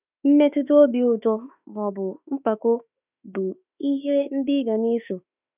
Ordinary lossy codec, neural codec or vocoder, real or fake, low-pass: none; autoencoder, 48 kHz, 32 numbers a frame, DAC-VAE, trained on Japanese speech; fake; 3.6 kHz